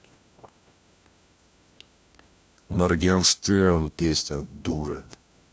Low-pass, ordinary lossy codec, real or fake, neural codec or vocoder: none; none; fake; codec, 16 kHz, 1 kbps, FunCodec, trained on LibriTTS, 50 frames a second